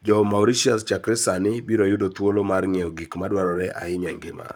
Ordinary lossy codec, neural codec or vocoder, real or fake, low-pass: none; codec, 44.1 kHz, 7.8 kbps, Pupu-Codec; fake; none